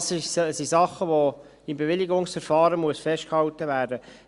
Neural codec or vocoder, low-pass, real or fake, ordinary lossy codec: none; 10.8 kHz; real; Opus, 64 kbps